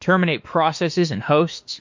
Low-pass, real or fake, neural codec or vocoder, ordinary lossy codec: 7.2 kHz; fake; autoencoder, 48 kHz, 32 numbers a frame, DAC-VAE, trained on Japanese speech; MP3, 48 kbps